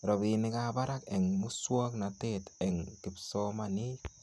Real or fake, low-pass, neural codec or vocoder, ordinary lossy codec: real; none; none; none